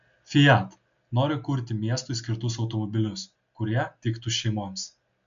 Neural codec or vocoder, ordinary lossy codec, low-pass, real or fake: none; MP3, 64 kbps; 7.2 kHz; real